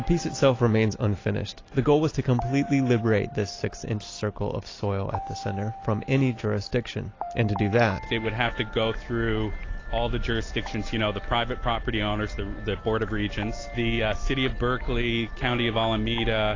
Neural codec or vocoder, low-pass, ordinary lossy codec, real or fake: none; 7.2 kHz; AAC, 32 kbps; real